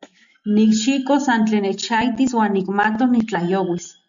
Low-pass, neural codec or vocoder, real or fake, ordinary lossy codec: 7.2 kHz; none; real; MP3, 64 kbps